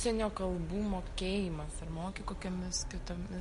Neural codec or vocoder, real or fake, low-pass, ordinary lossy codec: none; real; 10.8 kHz; MP3, 48 kbps